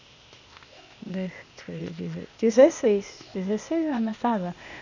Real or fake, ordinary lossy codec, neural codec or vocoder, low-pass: fake; none; codec, 16 kHz, 0.8 kbps, ZipCodec; 7.2 kHz